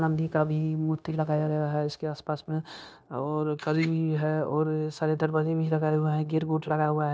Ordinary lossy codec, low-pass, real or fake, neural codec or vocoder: none; none; fake; codec, 16 kHz, 0.9 kbps, LongCat-Audio-Codec